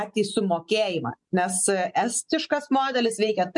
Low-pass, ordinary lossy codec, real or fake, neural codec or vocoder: 10.8 kHz; MP3, 64 kbps; real; none